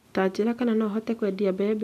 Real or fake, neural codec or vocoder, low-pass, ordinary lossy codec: real; none; 14.4 kHz; none